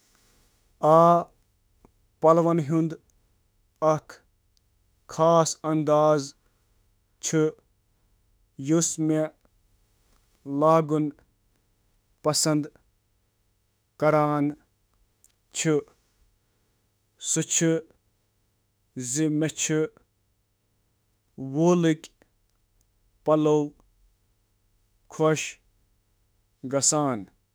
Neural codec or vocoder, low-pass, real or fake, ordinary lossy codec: autoencoder, 48 kHz, 32 numbers a frame, DAC-VAE, trained on Japanese speech; none; fake; none